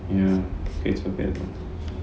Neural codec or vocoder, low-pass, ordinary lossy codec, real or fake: none; none; none; real